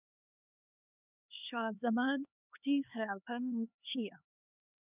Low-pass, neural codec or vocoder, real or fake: 3.6 kHz; codec, 16 kHz, 2 kbps, X-Codec, HuBERT features, trained on LibriSpeech; fake